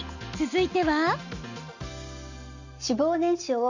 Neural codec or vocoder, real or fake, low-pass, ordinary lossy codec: none; real; 7.2 kHz; none